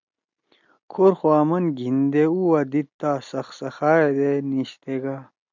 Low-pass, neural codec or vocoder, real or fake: 7.2 kHz; none; real